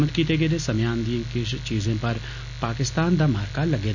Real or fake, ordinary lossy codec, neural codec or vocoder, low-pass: real; none; none; 7.2 kHz